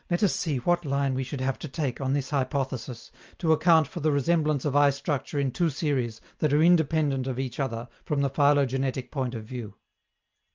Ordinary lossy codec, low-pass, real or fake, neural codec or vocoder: Opus, 24 kbps; 7.2 kHz; real; none